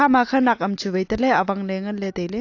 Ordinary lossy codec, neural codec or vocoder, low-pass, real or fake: AAC, 48 kbps; none; 7.2 kHz; real